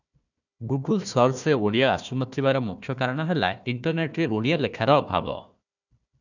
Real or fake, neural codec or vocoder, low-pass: fake; codec, 16 kHz, 1 kbps, FunCodec, trained on Chinese and English, 50 frames a second; 7.2 kHz